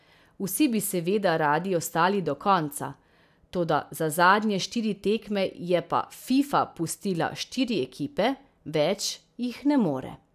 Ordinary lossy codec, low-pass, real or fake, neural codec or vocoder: none; 14.4 kHz; real; none